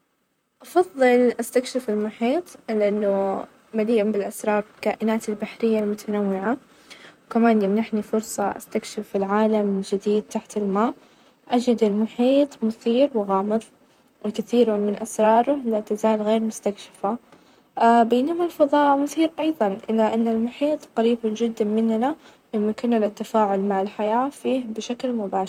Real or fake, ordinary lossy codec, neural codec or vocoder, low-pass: fake; none; vocoder, 44.1 kHz, 128 mel bands, Pupu-Vocoder; 19.8 kHz